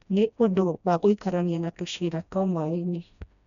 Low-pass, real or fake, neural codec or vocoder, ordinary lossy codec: 7.2 kHz; fake; codec, 16 kHz, 1 kbps, FreqCodec, smaller model; none